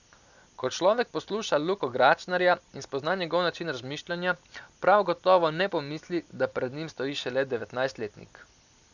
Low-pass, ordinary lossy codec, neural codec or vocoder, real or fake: 7.2 kHz; none; none; real